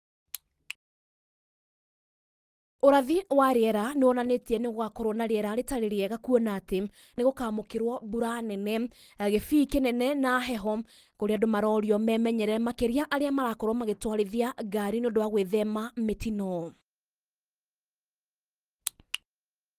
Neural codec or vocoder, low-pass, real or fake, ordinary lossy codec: none; 14.4 kHz; real; Opus, 24 kbps